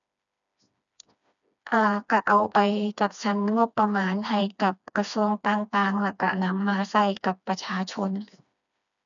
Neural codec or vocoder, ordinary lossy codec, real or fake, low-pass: codec, 16 kHz, 2 kbps, FreqCodec, smaller model; none; fake; 7.2 kHz